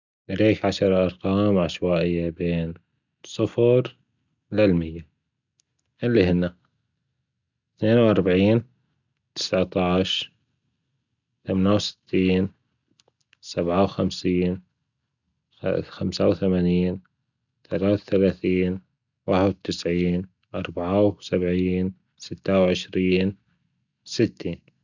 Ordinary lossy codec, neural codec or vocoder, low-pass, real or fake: none; none; 7.2 kHz; real